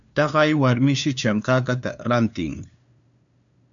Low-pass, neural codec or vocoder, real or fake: 7.2 kHz; codec, 16 kHz, 2 kbps, FunCodec, trained on LibriTTS, 25 frames a second; fake